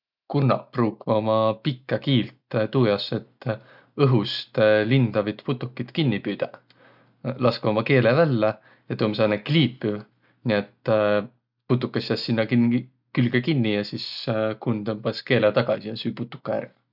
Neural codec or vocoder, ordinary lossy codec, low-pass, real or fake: none; none; 5.4 kHz; real